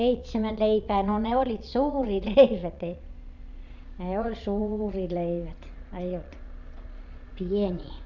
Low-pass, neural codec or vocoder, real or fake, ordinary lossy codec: 7.2 kHz; vocoder, 22.05 kHz, 80 mel bands, Vocos; fake; none